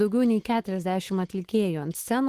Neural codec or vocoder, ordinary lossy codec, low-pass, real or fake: autoencoder, 48 kHz, 128 numbers a frame, DAC-VAE, trained on Japanese speech; Opus, 16 kbps; 14.4 kHz; fake